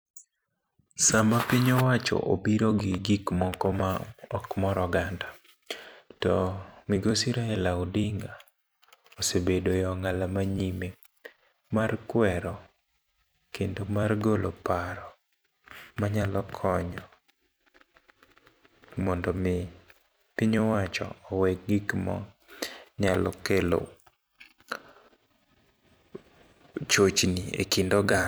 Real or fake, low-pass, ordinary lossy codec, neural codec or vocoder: fake; none; none; vocoder, 44.1 kHz, 128 mel bands every 256 samples, BigVGAN v2